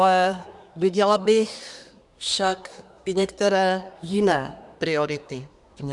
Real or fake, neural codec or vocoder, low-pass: fake; codec, 24 kHz, 1 kbps, SNAC; 10.8 kHz